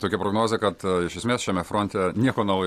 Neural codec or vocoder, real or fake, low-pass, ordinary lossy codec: none; real; 14.4 kHz; AAC, 64 kbps